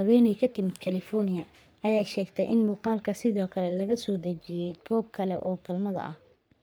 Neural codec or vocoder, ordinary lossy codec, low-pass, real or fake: codec, 44.1 kHz, 3.4 kbps, Pupu-Codec; none; none; fake